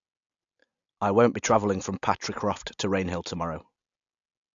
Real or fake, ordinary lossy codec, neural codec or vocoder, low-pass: real; AAC, 64 kbps; none; 7.2 kHz